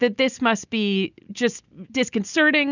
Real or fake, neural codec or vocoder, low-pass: real; none; 7.2 kHz